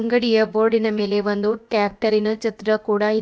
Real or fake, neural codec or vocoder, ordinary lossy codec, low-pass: fake; codec, 16 kHz, about 1 kbps, DyCAST, with the encoder's durations; none; none